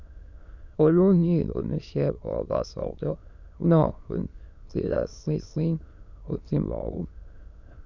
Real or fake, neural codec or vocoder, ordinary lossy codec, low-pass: fake; autoencoder, 22.05 kHz, a latent of 192 numbers a frame, VITS, trained on many speakers; none; 7.2 kHz